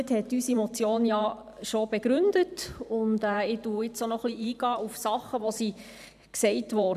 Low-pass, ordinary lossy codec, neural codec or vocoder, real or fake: 14.4 kHz; none; vocoder, 44.1 kHz, 128 mel bands every 512 samples, BigVGAN v2; fake